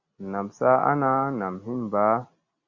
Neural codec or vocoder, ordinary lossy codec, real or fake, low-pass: none; Opus, 64 kbps; real; 7.2 kHz